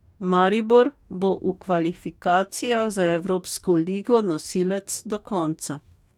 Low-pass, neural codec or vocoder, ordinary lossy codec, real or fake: 19.8 kHz; codec, 44.1 kHz, 2.6 kbps, DAC; none; fake